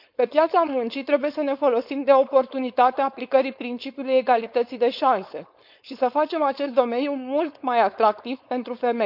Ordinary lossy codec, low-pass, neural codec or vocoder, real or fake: none; 5.4 kHz; codec, 16 kHz, 4.8 kbps, FACodec; fake